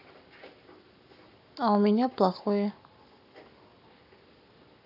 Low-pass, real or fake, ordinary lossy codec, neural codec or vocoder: 5.4 kHz; fake; none; codec, 44.1 kHz, 7.8 kbps, Pupu-Codec